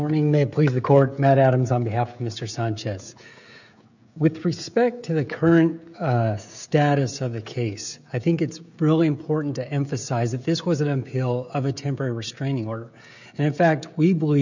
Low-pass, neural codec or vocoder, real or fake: 7.2 kHz; codec, 16 kHz, 16 kbps, FreqCodec, smaller model; fake